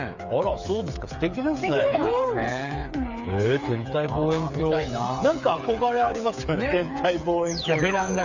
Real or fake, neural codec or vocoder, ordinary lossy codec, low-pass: fake; codec, 16 kHz, 8 kbps, FreqCodec, smaller model; Opus, 64 kbps; 7.2 kHz